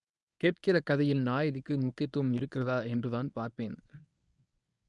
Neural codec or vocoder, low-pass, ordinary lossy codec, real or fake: codec, 24 kHz, 0.9 kbps, WavTokenizer, medium speech release version 1; 10.8 kHz; none; fake